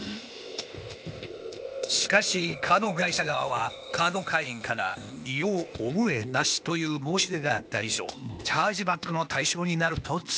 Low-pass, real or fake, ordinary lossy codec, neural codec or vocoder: none; fake; none; codec, 16 kHz, 0.8 kbps, ZipCodec